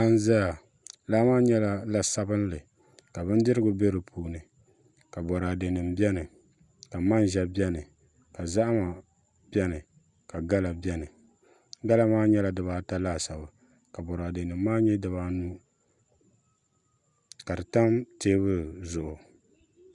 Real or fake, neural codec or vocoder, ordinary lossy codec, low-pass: real; none; Opus, 64 kbps; 10.8 kHz